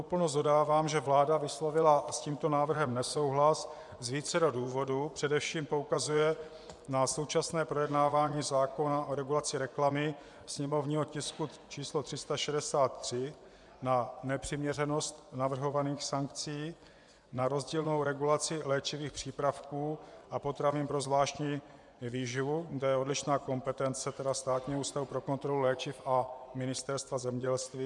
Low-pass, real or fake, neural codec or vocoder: 10.8 kHz; fake; vocoder, 24 kHz, 100 mel bands, Vocos